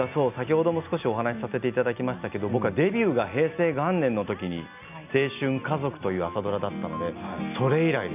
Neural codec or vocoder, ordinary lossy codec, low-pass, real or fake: none; none; 3.6 kHz; real